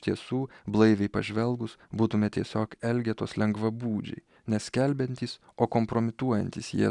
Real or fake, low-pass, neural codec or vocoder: real; 10.8 kHz; none